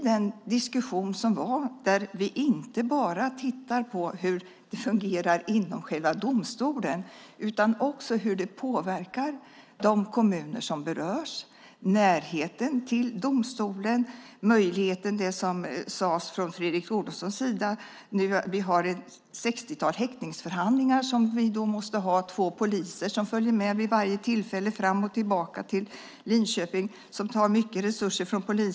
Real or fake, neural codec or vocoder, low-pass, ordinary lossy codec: real; none; none; none